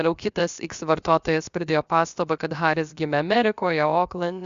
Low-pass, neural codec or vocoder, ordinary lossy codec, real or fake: 7.2 kHz; codec, 16 kHz, about 1 kbps, DyCAST, with the encoder's durations; Opus, 64 kbps; fake